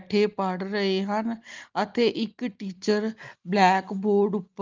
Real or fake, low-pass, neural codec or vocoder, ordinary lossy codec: real; 7.2 kHz; none; Opus, 32 kbps